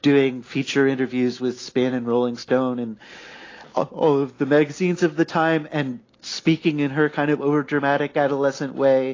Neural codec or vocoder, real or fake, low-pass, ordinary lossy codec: none; real; 7.2 kHz; AAC, 32 kbps